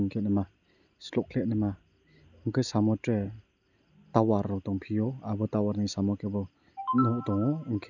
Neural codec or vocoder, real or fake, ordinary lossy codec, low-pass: none; real; none; 7.2 kHz